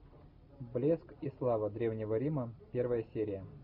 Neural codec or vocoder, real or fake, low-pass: none; real; 5.4 kHz